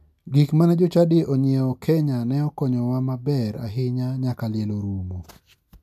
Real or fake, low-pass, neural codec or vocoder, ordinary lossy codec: real; 14.4 kHz; none; none